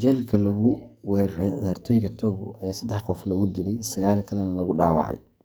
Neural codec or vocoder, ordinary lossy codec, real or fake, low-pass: codec, 44.1 kHz, 2.6 kbps, SNAC; none; fake; none